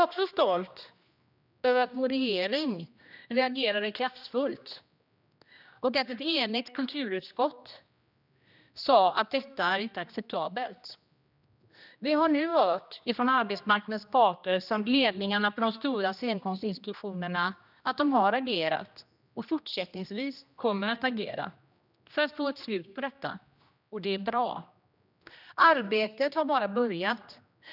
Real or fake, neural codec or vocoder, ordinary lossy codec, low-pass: fake; codec, 16 kHz, 1 kbps, X-Codec, HuBERT features, trained on general audio; none; 5.4 kHz